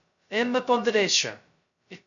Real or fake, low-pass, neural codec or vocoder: fake; 7.2 kHz; codec, 16 kHz, 0.2 kbps, FocalCodec